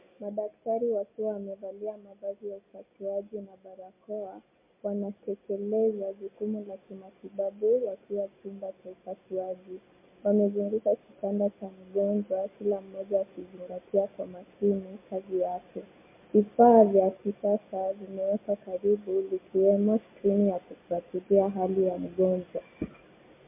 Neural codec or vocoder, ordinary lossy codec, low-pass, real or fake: none; MP3, 32 kbps; 3.6 kHz; real